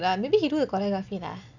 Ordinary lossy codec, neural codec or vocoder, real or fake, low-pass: none; none; real; 7.2 kHz